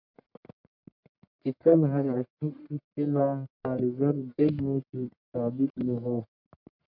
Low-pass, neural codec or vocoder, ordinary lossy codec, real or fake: 5.4 kHz; codec, 44.1 kHz, 1.7 kbps, Pupu-Codec; MP3, 48 kbps; fake